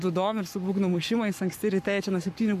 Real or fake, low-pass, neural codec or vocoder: fake; 14.4 kHz; codec, 44.1 kHz, 7.8 kbps, Pupu-Codec